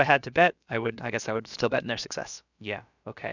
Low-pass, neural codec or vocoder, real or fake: 7.2 kHz; codec, 16 kHz, about 1 kbps, DyCAST, with the encoder's durations; fake